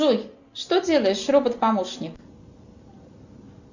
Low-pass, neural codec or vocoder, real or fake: 7.2 kHz; none; real